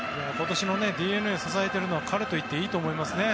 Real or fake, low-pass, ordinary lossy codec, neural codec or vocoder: real; none; none; none